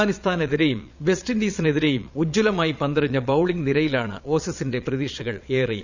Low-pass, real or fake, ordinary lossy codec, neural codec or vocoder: 7.2 kHz; fake; none; vocoder, 22.05 kHz, 80 mel bands, Vocos